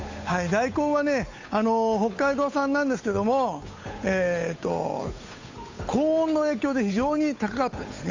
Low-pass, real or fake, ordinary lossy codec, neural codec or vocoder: 7.2 kHz; fake; AAC, 48 kbps; codec, 16 kHz, 8 kbps, FunCodec, trained on Chinese and English, 25 frames a second